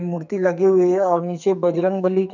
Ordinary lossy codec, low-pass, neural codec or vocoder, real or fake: none; 7.2 kHz; codec, 44.1 kHz, 2.6 kbps, SNAC; fake